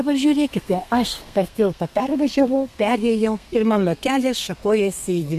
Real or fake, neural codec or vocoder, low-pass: fake; codec, 32 kHz, 1.9 kbps, SNAC; 14.4 kHz